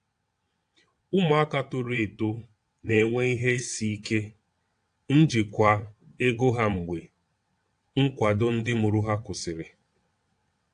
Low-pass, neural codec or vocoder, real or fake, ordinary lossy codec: 9.9 kHz; vocoder, 22.05 kHz, 80 mel bands, WaveNeXt; fake; AAC, 64 kbps